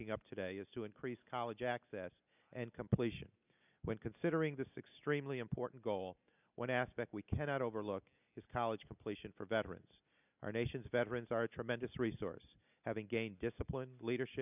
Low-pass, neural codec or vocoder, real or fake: 3.6 kHz; none; real